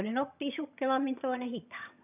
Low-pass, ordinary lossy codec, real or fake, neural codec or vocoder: 3.6 kHz; none; fake; vocoder, 22.05 kHz, 80 mel bands, HiFi-GAN